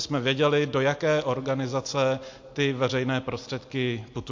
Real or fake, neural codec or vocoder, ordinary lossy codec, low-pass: real; none; MP3, 48 kbps; 7.2 kHz